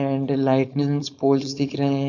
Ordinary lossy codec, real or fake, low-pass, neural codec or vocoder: none; fake; 7.2 kHz; codec, 16 kHz, 4.8 kbps, FACodec